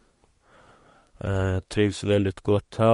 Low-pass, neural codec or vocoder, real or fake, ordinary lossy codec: 10.8 kHz; codec, 24 kHz, 1 kbps, SNAC; fake; MP3, 48 kbps